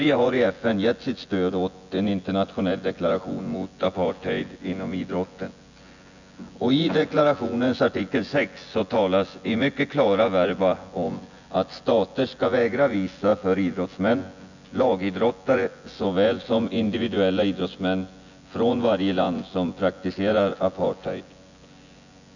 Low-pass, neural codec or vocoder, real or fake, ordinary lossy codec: 7.2 kHz; vocoder, 24 kHz, 100 mel bands, Vocos; fake; none